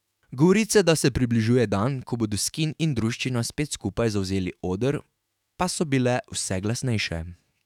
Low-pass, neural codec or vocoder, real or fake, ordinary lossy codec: 19.8 kHz; autoencoder, 48 kHz, 128 numbers a frame, DAC-VAE, trained on Japanese speech; fake; none